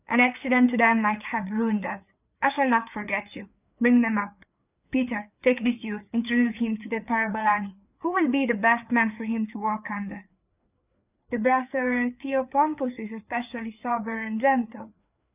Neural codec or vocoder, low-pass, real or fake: codec, 16 kHz, 4 kbps, FreqCodec, larger model; 3.6 kHz; fake